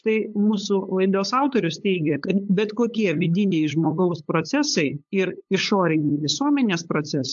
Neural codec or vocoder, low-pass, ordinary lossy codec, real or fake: codec, 16 kHz, 8 kbps, FunCodec, trained on LibriTTS, 25 frames a second; 7.2 kHz; AAC, 64 kbps; fake